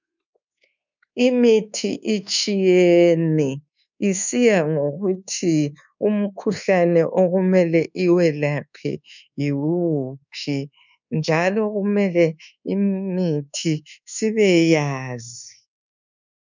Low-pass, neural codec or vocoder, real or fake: 7.2 kHz; codec, 24 kHz, 1.2 kbps, DualCodec; fake